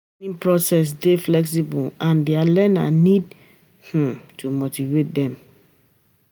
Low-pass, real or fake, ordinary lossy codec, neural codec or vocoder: none; real; none; none